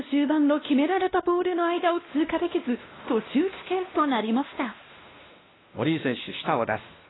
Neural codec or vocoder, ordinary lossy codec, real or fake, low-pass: codec, 16 kHz, 1 kbps, X-Codec, WavLM features, trained on Multilingual LibriSpeech; AAC, 16 kbps; fake; 7.2 kHz